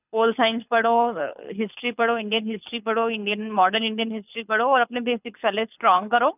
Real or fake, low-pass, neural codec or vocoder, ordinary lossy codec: fake; 3.6 kHz; codec, 24 kHz, 6 kbps, HILCodec; none